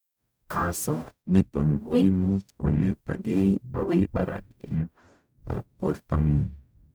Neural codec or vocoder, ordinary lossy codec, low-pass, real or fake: codec, 44.1 kHz, 0.9 kbps, DAC; none; none; fake